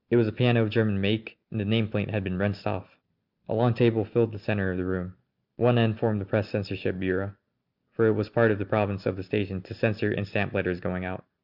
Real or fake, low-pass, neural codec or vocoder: real; 5.4 kHz; none